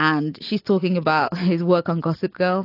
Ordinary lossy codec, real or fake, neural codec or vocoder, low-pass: AAC, 32 kbps; real; none; 5.4 kHz